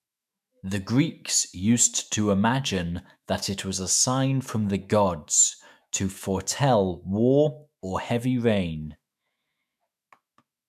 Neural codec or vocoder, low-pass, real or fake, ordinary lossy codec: autoencoder, 48 kHz, 128 numbers a frame, DAC-VAE, trained on Japanese speech; 14.4 kHz; fake; none